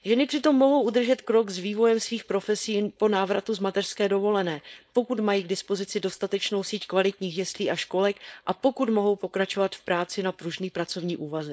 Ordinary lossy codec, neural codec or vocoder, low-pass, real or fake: none; codec, 16 kHz, 4.8 kbps, FACodec; none; fake